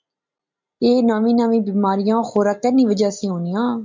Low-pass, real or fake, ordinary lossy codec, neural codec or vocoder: 7.2 kHz; real; MP3, 64 kbps; none